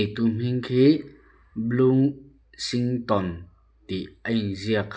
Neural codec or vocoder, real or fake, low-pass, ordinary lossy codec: none; real; none; none